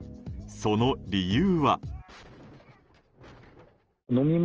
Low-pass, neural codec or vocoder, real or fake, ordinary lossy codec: 7.2 kHz; none; real; Opus, 24 kbps